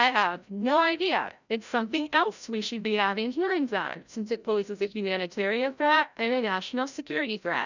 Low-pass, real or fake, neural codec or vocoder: 7.2 kHz; fake; codec, 16 kHz, 0.5 kbps, FreqCodec, larger model